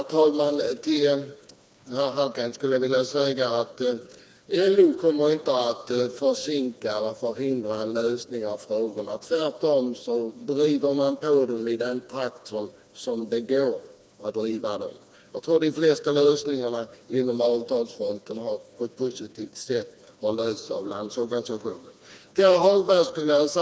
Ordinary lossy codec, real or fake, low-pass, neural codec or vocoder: none; fake; none; codec, 16 kHz, 2 kbps, FreqCodec, smaller model